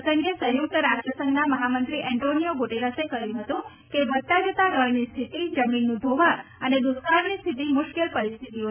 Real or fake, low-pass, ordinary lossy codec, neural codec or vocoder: real; 3.6 kHz; none; none